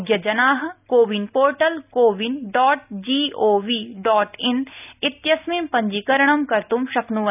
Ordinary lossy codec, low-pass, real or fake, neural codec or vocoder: none; 3.6 kHz; real; none